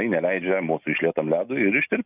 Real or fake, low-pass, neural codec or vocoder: real; 3.6 kHz; none